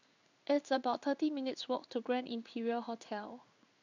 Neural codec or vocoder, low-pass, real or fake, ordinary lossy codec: codec, 16 kHz in and 24 kHz out, 1 kbps, XY-Tokenizer; 7.2 kHz; fake; none